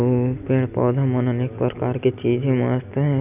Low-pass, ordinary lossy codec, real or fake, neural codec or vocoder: 3.6 kHz; none; real; none